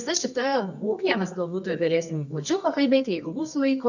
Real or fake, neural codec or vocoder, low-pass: fake; codec, 24 kHz, 0.9 kbps, WavTokenizer, medium music audio release; 7.2 kHz